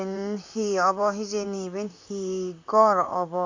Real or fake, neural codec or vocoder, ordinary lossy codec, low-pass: fake; vocoder, 44.1 kHz, 128 mel bands, Pupu-Vocoder; AAC, 48 kbps; 7.2 kHz